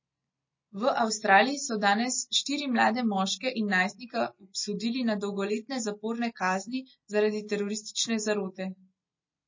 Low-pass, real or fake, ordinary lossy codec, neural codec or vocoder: 7.2 kHz; real; MP3, 32 kbps; none